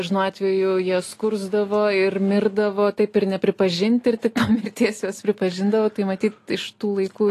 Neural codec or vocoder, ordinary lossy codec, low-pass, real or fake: none; AAC, 48 kbps; 14.4 kHz; real